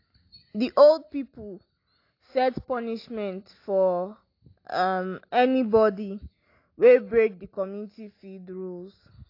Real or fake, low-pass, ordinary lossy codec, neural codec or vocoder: real; 5.4 kHz; AAC, 32 kbps; none